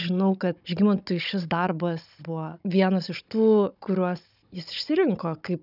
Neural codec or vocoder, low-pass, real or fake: codec, 16 kHz, 16 kbps, FunCodec, trained on Chinese and English, 50 frames a second; 5.4 kHz; fake